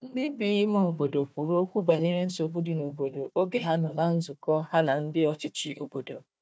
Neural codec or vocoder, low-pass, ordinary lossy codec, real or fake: codec, 16 kHz, 1 kbps, FunCodec, trained on Chinese and English, 50 frames a second; none; none; fake